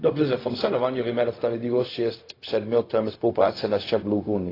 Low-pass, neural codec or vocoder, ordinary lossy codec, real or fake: 5.4 kHz; codec, 16 kHz, 0.4 kbps, LongCat-Audio-Codec; AAC, 24 kbps; fake